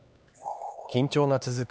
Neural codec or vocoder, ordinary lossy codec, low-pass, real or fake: codec, 16 kHz, 2 kbps, X-Codec, HuBERT features, trained on LibriSpeech; none; none; fake